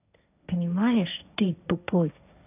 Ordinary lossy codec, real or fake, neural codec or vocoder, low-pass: none; fake; codec, 16 kHz, 1.1 kbps, Voila-Tokenizer; 3.6 kHz